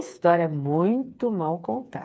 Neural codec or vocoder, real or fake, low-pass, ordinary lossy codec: codec, 16 kHz, 4 kbps, FreqCodec, smaller model; fake; none; none